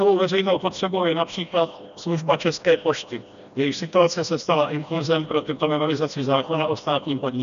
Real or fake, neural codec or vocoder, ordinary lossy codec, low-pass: fake; codec, 16 kHz, 1 kbps, FreqCodec, smaller model; MP3, 96 kbps; 7.2 kHz